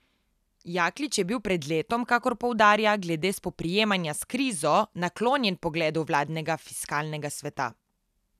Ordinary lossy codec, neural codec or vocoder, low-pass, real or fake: none; none; 14.4 kHz; real